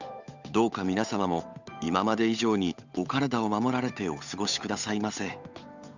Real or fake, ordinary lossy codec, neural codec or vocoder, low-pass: fake; none; codec, 16 kHz, 8 kbps, FunCodec, trained on Chinese and English, 25 frames a second; 7.2 kHz